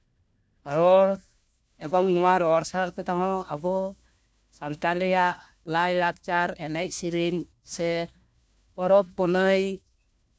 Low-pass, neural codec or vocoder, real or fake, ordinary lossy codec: none; codec, 16 kHz, 1 kbps, FunCodec, trained on LibriTTS, 50 frames a second; fake; none